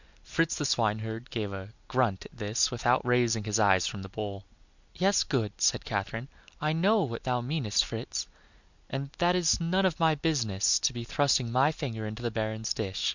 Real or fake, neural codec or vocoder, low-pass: real; none; 7.2 kHz